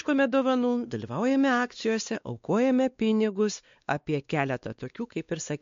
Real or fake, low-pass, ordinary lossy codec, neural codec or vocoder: fake; 7.2 kHz; MP3, 48 kbps; codec, 16 kHz, 2 kbps, X-Codec, WavLM features, trained on Multilingual LibriSpeech